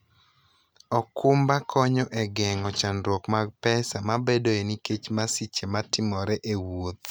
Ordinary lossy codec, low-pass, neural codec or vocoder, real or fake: none; none; none; real